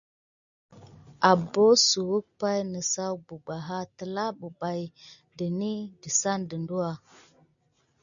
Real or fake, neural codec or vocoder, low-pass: real; none; 7.2 kHz